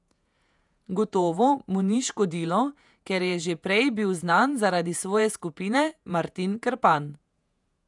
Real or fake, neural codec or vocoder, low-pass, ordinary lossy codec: fake; vocoder, 48 kHz, 128 mel bands, Vocos; 10.8 kHz; none